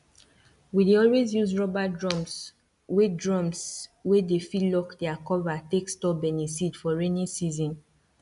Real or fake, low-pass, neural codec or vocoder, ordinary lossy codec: real; 10.8 kHz; none; none